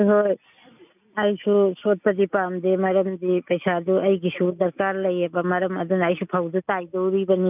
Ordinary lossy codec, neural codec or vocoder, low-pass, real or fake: none; none; 3.6 kHz; real